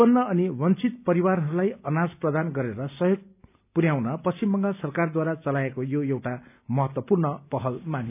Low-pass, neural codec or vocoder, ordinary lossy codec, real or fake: 3.6 kHz; none; none; real